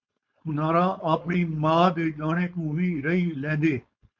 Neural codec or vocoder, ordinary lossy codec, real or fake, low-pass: codec, 16 kHz, 4.8 kbps, FACodec; MP3, 48 kbps; fake; 7.2 kHz